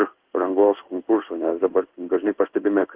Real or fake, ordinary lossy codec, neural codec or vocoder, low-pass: fake; Opus, 16 kbps; codec, 16 kHz in and 24 kHz out, 1 kbps, XY-Tokenizer; 3.6 kHz